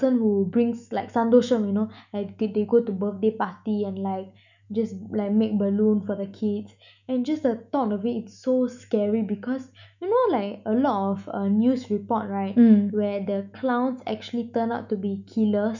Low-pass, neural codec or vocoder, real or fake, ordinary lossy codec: 7.2 kHz; autoencoder, 48 kHz, 128 numbers a frame, DAC-VAE, trained on Japanese speech; fake; none